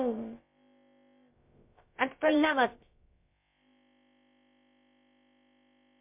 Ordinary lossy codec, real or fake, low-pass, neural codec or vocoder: MP3, 32 kbps; fake; 3.6 kHz; codec, 16 kHz, about 1 kbps, DyCAST, with the encoder's durations